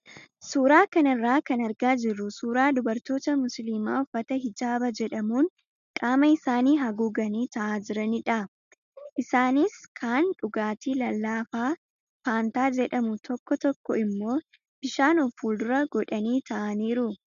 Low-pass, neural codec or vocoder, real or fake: 7.2 kHz; none; real